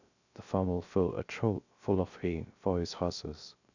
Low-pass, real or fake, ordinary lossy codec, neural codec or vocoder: 7.2 kHz; fake; none; codec, 16 kHz, 0.3 kbps, FocalCodec